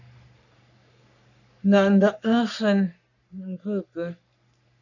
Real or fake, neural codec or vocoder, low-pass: fake; codec, 44.1 kHz, 3.4 kbps, Pupu-Codec; 7.2 kHz